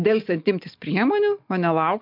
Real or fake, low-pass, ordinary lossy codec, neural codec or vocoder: real; 5.4 kHz; MP3, 48 kbps; none